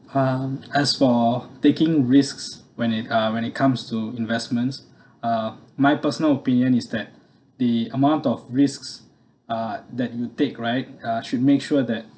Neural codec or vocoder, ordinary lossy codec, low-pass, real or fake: none; none; none; real